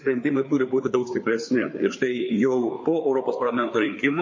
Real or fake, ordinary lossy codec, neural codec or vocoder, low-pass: fake; MP3, 32 kbps; codec, 16 kHz, 4 kbps, FreqCodec, larger model; 7.2 kHz